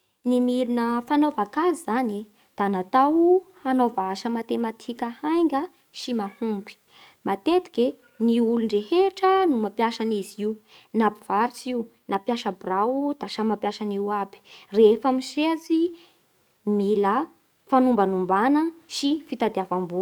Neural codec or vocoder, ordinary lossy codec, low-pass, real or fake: codec, 44.1 kHz, 7.8 kbps, DAC; none; none; fake